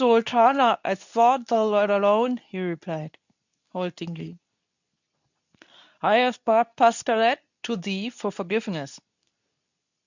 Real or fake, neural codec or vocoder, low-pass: fake; codec, 24 kHz, 0.9 kbps, WavTokenizer, medium speech release version 2; 7.2 kHz